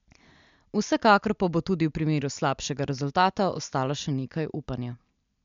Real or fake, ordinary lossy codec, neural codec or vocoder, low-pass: real; MP3, 64 kbps; none; 7.2 kHz